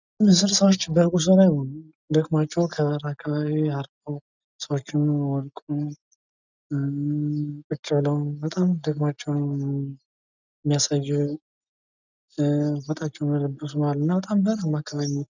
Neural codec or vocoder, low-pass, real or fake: none; 7.2 kHz; real